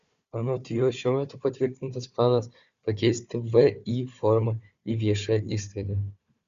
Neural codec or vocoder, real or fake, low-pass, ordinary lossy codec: codec, 16 kHz, 4 kbps, FunCodec, trained on Chinese and English, 50 frames a second; fake; 7.2 kHz; Opus, 64 kbps